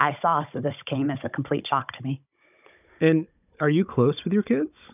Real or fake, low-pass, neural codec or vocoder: fake; 3.6 kHz; codec, 16 kHz, 16 kbps, FunCodec, trained on Chinese and English, 50 frames a second